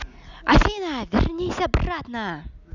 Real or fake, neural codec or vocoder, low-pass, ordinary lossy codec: real; none; 7.2 kHz; none